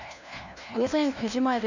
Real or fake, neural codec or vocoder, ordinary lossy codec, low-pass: fake; codec, 16 kHz, 1 kbps, FunCodec, trained on LibriTTS, 50 frames a second; none; 7.2 kHz